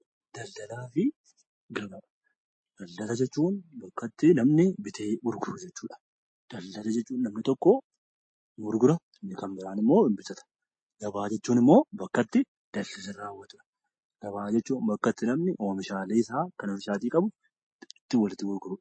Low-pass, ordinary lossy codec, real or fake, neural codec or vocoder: 9.9 kHz; MP3, 32 kbps; real; none